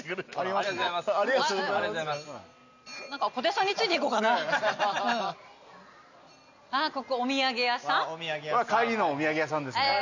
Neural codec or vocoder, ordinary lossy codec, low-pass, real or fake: none; none; 7.2 kHz; real